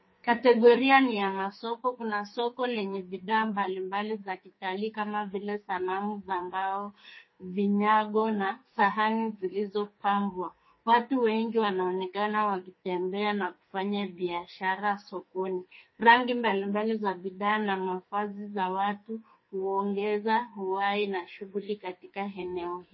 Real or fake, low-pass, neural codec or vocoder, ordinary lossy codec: fake; 7.2 kHz; codec, 44.1 kHz, 2.6 kbps, SNAC; MP3, 24 kbps